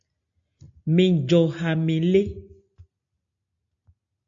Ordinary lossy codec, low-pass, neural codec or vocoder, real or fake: AAC, 64 kbps; 7.2 kHz; none; real